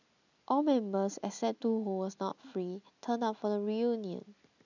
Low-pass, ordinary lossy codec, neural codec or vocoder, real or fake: 7.2 kHz; none; none; real